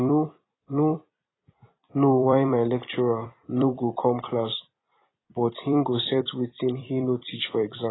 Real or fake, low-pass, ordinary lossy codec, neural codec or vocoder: fake; 7.2 kHz; AAC, 16 kbps; vocoder, 44.1 kHz, 128 mel bands every 256 samples, BigVGAN v2